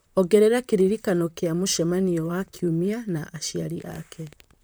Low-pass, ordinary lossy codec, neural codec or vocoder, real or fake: none; none; vocoder, 44.1 kHz, 128 mel bands, Pupu-Vocoder; fake